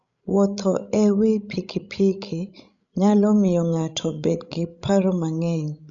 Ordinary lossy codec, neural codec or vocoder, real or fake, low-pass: none; codec, 16 kHz, 16 kbps, FreqCodec, larger model; fake; 7.2 kHz